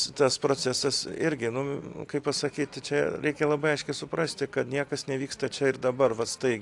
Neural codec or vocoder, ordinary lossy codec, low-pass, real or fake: none; AAC, 64 kbps; 10.8 kHz; real